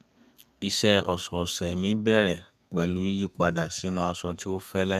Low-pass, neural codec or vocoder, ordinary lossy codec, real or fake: 14.4 kHz; codec, 32 kHz, 1.9 kbps, SNAC; none; fake